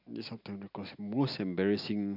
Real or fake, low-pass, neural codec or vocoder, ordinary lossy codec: real; 5.4 kHz; none; none